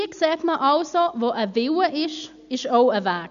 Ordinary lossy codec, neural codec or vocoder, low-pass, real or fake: none; none; 7.2 kHz; real